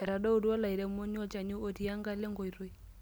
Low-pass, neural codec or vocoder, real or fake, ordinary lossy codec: none; none; real; none